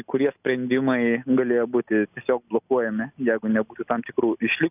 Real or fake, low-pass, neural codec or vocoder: real; 3.6 kHz; none